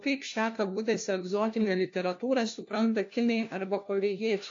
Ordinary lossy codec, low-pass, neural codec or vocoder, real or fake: AAC, 48 kbps; 7.2 kHz; codec, 16 kHz, 1 kbps, FunCodec, trained on LibriTTS, 50 frames a second; fake